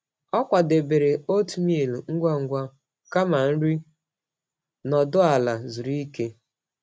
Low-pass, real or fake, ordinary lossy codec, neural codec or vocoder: none; real; none; none